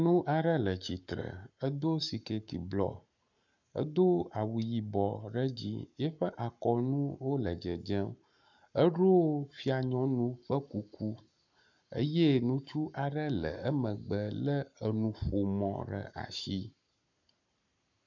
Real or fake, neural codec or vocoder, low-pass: fake; codec, 44.1 kHz, 7.8 kbps, Pupu-Codec; 7.2 kHz